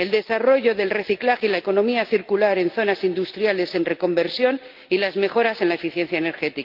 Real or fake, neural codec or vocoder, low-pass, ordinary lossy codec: real; none; 5.4 kHz; Opus, 32 kbps